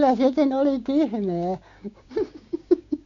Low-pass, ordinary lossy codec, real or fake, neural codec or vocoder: 7.2 kHz; MP3, 48 kbps; fake; codec, 16 kHz, 6 kbps, DAC